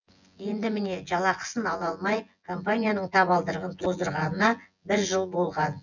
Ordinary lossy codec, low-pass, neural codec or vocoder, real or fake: none; 7.2 kHz; vocoder, 24 kHz, 100 mel bands, Vocos; fake